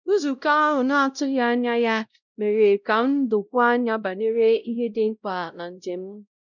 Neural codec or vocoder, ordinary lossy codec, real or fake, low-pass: codec, 16 kHz, 0.5 kbps, X-Codec, WavLM features, trained on Multilingual LibriSpeech; none; fake; 7.2 kHz